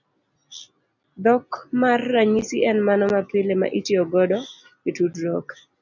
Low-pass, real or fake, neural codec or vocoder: 7.2 kHz; real; none